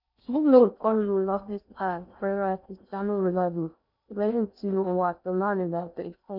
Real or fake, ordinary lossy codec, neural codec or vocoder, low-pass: fake; none; codec, 16 kHz in and 24 kHz out, 0.6 kbps, FocalCodec, streaming, 4096 codes; 5.4 kHz